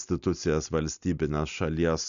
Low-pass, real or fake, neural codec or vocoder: 7.2 kHz; real; none